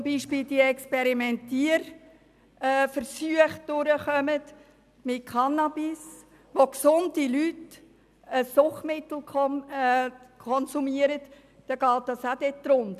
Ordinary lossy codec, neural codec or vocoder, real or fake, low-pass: none; none; real; 14.4 kHz